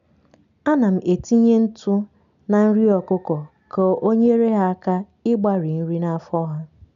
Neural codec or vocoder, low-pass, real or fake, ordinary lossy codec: none; 7.2 kHz; real; none